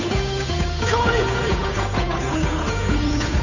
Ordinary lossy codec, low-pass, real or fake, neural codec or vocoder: none; 7.2 kHz; fake; codec, 16 kHz, 8 kbps, FunCodec, trained on Chinese and English, 25 frames a second